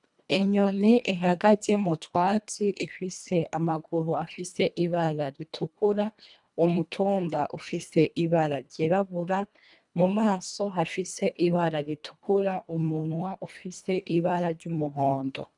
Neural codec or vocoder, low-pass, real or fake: codec, 24 kHz, 1.5 kbps, HILCodec; 10.8 kHz; fake